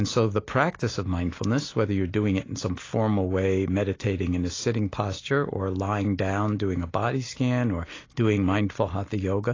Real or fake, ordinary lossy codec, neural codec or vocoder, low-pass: real; AAC, 32 kbps; none; 7.2 kHz